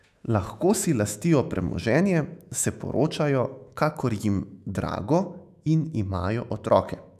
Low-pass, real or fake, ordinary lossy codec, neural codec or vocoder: 14.4 kHz; fake; none; autoencoder, 48 kHz, 128 numbers a frame, DAC-VAE, trained on Japanese speech